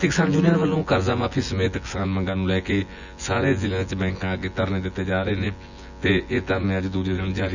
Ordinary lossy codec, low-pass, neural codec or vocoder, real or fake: none; 7.2 kHz; vocoder, 24 kHz, 100 mel bands, Vocos; fake